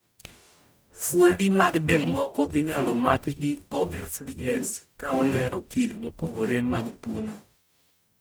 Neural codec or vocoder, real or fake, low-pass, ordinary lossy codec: codec, 44.1 kHz, 0.9 kbps, DAC; fake; none; none